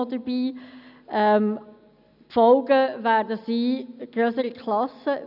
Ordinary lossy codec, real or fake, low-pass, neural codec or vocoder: none; real; 5.4 kHz; none